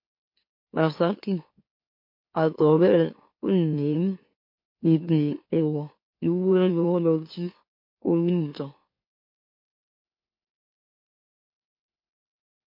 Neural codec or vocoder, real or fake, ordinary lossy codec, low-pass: autoencoder, 44.1 kHz, a latent of 192 numbers a frame, MeloTTS; fake; MP3, 32 kbps; 5.4 kHz